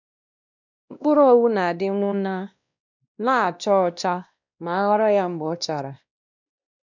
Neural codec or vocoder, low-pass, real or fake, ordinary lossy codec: codec, 16 kHz, 1 kbps, X-Codec, WavLM features, trained on Multilingual LibriSpeech; 7.2 kHz; fake; none